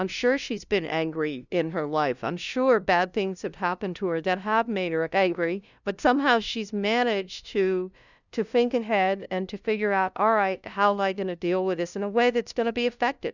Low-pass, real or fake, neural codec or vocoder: 7.2 kHz; fake; codec, 16 kHz, 0.5 kbps, FunCodec, trained on LibriTTS, 25 frames a second